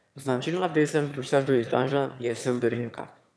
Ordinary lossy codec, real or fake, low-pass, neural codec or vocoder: none; fake; none; autoencoder, 22.05 kHz, a latent of 192 numbers a frame, VITS, trained on one speaker